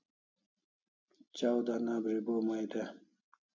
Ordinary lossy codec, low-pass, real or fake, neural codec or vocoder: MP3, 64 kbps; 7.2 kHz; real; none